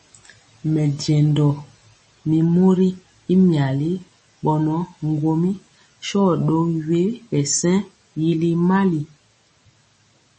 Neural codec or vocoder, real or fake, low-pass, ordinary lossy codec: none; real; 10.8 kHz; MP3, 32 kbps